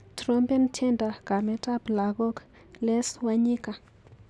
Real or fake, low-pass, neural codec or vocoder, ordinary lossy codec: real; none; none; none